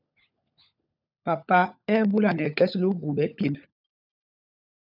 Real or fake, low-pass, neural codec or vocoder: fake; 5.4 kHz; codec, 16 kHz, 16 kbps, FunCodec, trained on LibriTTS, 50 frames a second